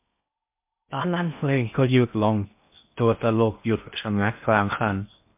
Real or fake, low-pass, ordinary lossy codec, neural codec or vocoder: fake; 3.6 kHz; MP3, 32 kbps; codec, 16 kHz in and 24 kHz out, 0.6 kbps, FocalCodec, streaming, 4096 codes